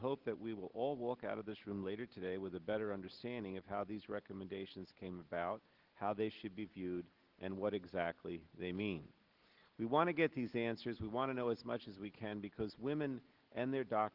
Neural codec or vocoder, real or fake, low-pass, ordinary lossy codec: none; real; 5.4 kHz; Opus, 16 kbps